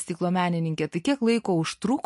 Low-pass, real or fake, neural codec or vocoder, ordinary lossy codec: 14.4 kHz; real; none; MP3, 48 kbps